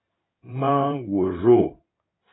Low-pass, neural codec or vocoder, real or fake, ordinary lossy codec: 7.2 kHz; codec, 16 kHz in and 24 kHz out, 2.2 kbps, FireRedTTS-2 codec; fake; AAC, 16 kbps